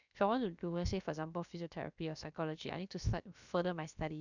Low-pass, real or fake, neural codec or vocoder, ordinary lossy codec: 7.2 kHz; fake; codec, 16 kHz, about 1 kbps, DyCAST, with the encoder's durations; none